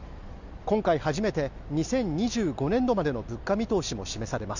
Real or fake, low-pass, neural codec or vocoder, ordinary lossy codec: real; 7.2 kHz; none; none